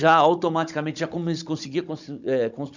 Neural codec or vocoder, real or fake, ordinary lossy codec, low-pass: codec, 16 kHz, 16 kbps, FunCodec, trained on Chinese and English, 50 frames a second; fake; none; 7.2 kHz